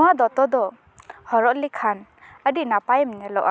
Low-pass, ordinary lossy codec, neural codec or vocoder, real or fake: none; none; none; real